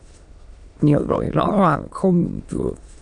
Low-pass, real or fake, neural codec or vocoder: 9.9 kHz; fake; autoencoder, 22.05 kHz, a latent of 192 numbers a frame, VITS, trained on many speakers